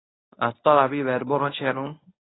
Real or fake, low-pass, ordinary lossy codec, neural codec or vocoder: fake; 7.2 kHz; AAC, 16 kbps; codec, 24 kHz, 0.9 kbps, WavTokenizer, medium speech release version 1